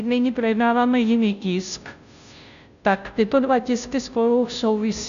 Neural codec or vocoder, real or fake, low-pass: codec, 16 kHz, 0.5 kbps, FunCodec, trained on Chinese and English, 25 frames a second; fake; 7.2 kHz